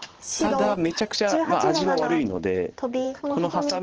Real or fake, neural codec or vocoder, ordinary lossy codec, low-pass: fake; vocoder, 44.1 kHz, 128 mel bands every 512 samples, BigVGAN v2; Opus, 16 kbps; 7.2 kHz